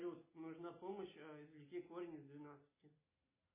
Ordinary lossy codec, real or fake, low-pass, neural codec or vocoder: MP3, 16 kbps; real; 3.6 kHz; none